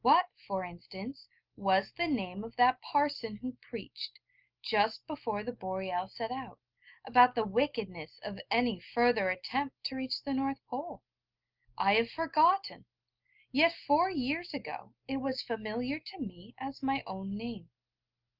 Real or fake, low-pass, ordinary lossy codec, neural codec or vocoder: real; 5.4 kHz; Opus, 32 kbps; none